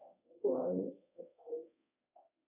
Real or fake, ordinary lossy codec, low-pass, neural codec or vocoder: fake; MP3, 24 kbps; 3.6 kHz; codec, 24 kHz, 0.5 kbps, DualCodec